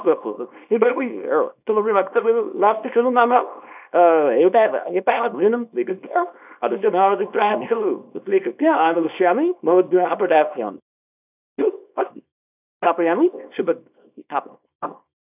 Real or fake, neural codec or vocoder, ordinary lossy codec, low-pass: fake; codec, 24 kHz, 0.9 kbps, WavTokenizer, small release; none; 3.6 kHz